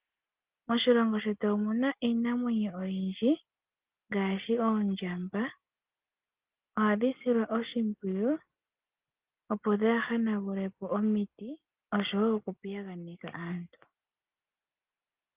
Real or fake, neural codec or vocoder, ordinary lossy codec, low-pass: real; none; Opus, 32 kbps; 3.6 kHz